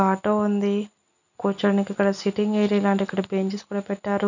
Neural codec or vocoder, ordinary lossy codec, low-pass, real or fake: none; none; 7.2 kHz; real